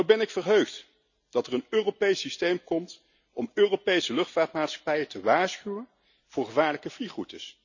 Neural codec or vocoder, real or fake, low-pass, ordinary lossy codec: none; real; 7.2 kHz; none